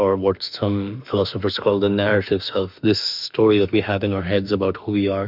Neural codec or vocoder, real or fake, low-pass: autoencoder, 48 kHz, 32 numbers a frame, DAC-VAE, trained on Japanese speech; fake; 5.4 kHz